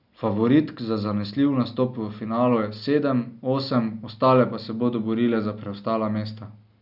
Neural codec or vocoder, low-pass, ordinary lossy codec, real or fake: none; 5.4 kHz; none; real